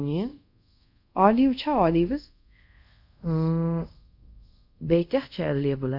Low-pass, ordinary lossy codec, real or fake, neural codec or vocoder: 5.4 kHz; none; fake; codec, 24 kHz, 0.5 kbps, DualCodec